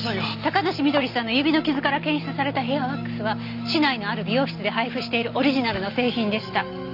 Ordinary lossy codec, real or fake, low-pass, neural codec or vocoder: none; real; 5.4 kHz; none